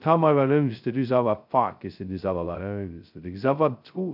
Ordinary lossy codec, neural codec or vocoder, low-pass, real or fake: none; codec, 16 kHz, 0.2 kbps, FocalCodec; 5.4 kHz; fake